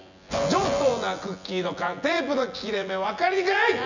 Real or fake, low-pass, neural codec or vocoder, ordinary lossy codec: fake; 7.2 kHz; vocoder, 24 kHz, 100 mel bands, Vocos; none